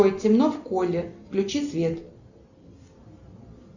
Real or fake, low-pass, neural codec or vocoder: real; 7.2 kHz; none